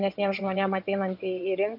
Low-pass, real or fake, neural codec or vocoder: 5.4 kHz; fake; vocoder, 22.05 kHz, 80 mel bands, WaveNeXt